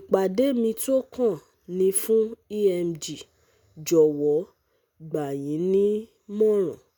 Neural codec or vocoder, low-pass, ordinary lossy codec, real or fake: none; none; none; real